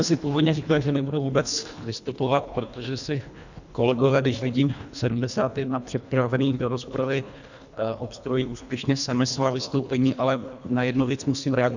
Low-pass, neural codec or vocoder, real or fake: 7.2 kHz; codec, 24 kHz, 1.5 kbps, HILCodec; fake